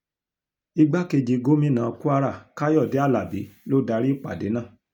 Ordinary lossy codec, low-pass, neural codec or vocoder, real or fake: none; 19.8 kHz; none; real